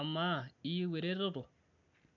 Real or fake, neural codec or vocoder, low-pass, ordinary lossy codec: real; none; 7.2 kHz; none